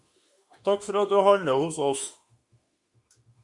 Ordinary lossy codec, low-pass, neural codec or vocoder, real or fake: Opus, 64 kbps; 10.8 kHz; autoencoder, 48 kHz, 32 numbers a frame, DAC-VAE, trained on Japanese speech; fake